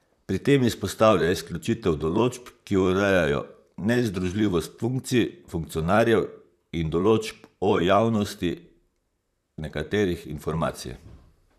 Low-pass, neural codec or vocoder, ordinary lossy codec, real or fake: 14.4 kHz; vocoder, 44.1 kHz, 128 mel bands, Pupu-Vocoder; none; fake